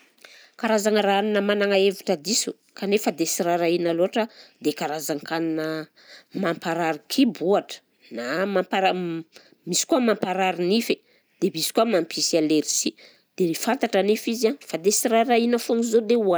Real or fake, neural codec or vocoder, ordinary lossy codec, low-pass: real; none; none; none